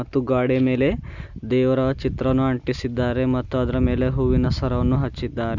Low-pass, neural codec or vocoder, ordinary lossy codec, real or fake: 7.2 kHz; none; none; real